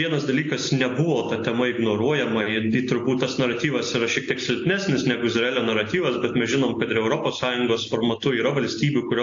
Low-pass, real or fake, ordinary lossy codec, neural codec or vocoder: 7.2 kHz; real; AAC, 48 kbps; none